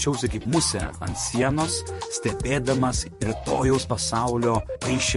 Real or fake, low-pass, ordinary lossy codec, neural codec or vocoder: fake; 14.4 kHz; MP3, 48 kbps; vocoder, 44.1 kHz, 128 mel bands, Pupu-Vocoder